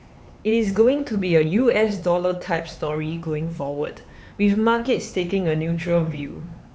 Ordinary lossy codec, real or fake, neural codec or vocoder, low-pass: none; fake; codec, 16 kHz, 4 kbps, X-Codec, HuBERT features, trained on LibriSpeech; none